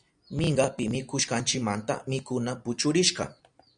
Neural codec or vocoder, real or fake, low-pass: none; real; 9.9 kHz